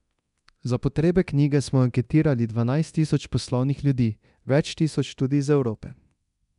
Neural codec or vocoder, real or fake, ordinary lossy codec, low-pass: codec, 24 kHz, 0.9 kbps, DualCodec; fake; MP3, 96 kbps; 10.8 kHz